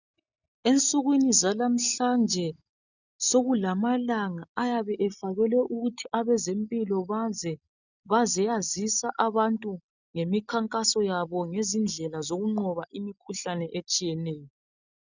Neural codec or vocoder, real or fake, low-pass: none; real; 7.2 kHz